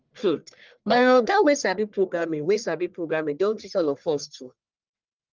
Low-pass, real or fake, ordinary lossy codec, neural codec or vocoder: 7.2 kHz; fake; Opus, 32 kbps; codec, 44.1 kHz, 1.7 kbps, Pupu-Codec